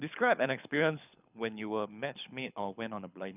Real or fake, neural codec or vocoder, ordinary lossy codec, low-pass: fake; codec, 24 kHz, 6 kbps, HILCodec; none; 3.6 kHz